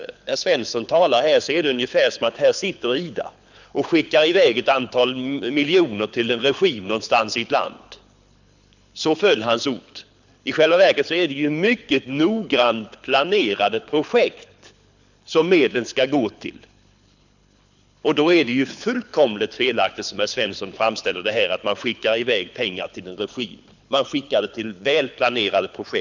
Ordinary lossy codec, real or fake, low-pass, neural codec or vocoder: none; fake; 7.2 kHz; codec, 24 kHz, 6 kbps, HILCodec